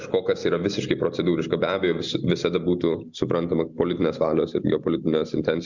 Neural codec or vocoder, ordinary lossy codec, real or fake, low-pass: none; Opus, 64 kbps; real; 7.2 kHz